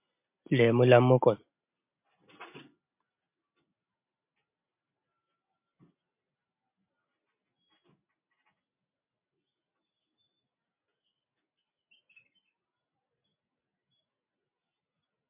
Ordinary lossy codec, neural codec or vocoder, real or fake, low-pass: MP3, 32 kbps; none; real; 3.6 kHz